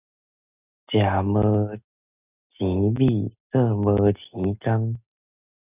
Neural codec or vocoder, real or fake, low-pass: none; real; 3.6 kHz